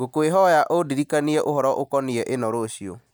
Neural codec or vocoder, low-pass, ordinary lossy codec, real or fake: none; none; none; real